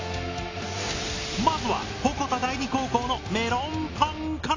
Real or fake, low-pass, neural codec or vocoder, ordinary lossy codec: real; 7.2 kHz; none; AAC, 32 kbps